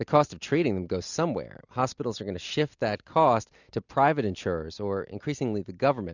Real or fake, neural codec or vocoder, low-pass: real; none; 7.2 kHz